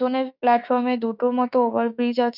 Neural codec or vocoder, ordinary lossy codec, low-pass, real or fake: autoencoder, 48 kHz, 32 numbers a frame, DAC-VAE, trained on Japanese speech; none; 5.4 kHz; fake